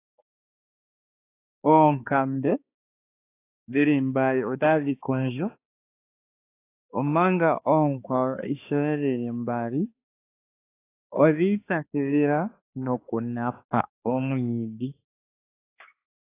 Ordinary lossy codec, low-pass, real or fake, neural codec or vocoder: AAC, 24 kbps; 3.6 kHz; fake; codec, 16 kHz, 2 kbps, X-Codec, HuBERT features, trained on balanced general audio